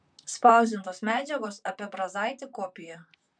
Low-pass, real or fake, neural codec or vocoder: 9.9 kHz; fake; vocoder, 44.1 kHz, 128 mel bands, Pupu-Vocoder